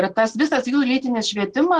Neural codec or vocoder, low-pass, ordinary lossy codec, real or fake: none; 10.8 kHz; Opus, 16 kbps; real